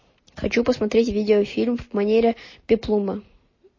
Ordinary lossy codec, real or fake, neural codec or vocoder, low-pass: MP3, 32 kbps; real; none; 7.2 kHz